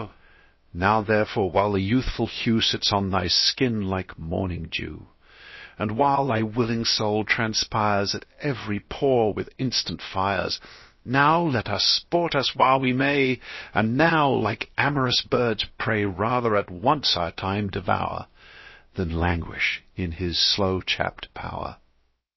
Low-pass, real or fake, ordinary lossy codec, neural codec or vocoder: 7.2 kHz; fake; MP3, 24 kbps; codec, 16 kHz, about 1 kbps, DyCAST, with the encoder's durations